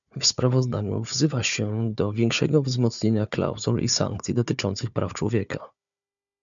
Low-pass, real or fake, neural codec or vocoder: 7.2 kHz; fake; codec, 16 kHz, 4 kbps, FunCodec, trained on Chinese and English, 50 frames a second